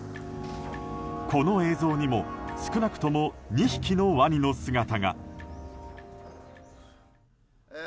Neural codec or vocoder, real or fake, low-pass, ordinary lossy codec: none; real; none; none